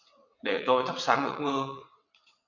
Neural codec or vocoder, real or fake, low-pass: vocoder, 22.05 kHz, 80 mel bands, WaveNeXt; fake; 7.2 kHz